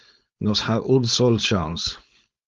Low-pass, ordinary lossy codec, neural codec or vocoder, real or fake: 7.2 kHz; Opus, 32 kbps; codec, 16 kHz, 4.8 kbps, FACodec; fake